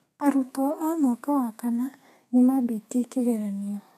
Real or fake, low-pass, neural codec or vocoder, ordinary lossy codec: fake; 14.4 kHz; codec, 32 kHz, 1.9 kbps, SNAC; none